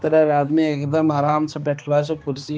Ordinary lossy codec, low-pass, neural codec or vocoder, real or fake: none; none; codec, 16 kHz, 2 kbps, X-Codec, HuBERT features, trained on balanced general audio; fake